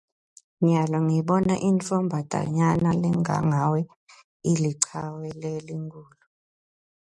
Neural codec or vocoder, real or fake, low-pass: none; real; 10.8 kHz